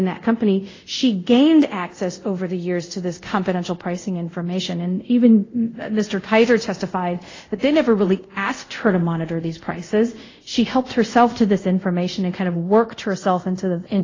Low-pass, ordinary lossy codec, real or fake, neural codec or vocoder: 7.2 kHz; AAC, 32 kbps; fake; codec, 24 kHz, 0.5 kbps, DualCodec